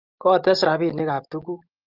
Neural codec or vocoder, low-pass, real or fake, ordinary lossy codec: none; 5.4 kHz; real; Opus, 32 kbps